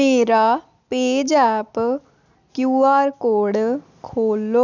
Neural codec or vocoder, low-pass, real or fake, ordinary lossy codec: none; 7.2 kHz; real; none